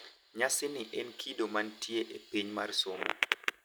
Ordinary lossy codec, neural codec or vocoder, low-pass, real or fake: none; none; none; real